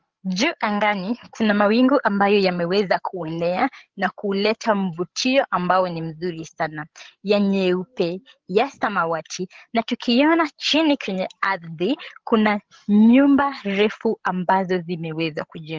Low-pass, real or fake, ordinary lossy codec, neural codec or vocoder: 7.2 kHz; fake; Opus, 16 kbps; codec, 16 kHz, 16 kbps, FreqCodec, larger model